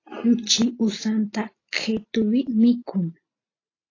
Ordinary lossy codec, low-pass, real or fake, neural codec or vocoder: AAC, 32 kbps; 7.2 kHz; real; none